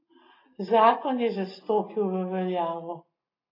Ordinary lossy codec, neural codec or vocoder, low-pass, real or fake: AAC, 24 kbps; none; 5.4 kHz; real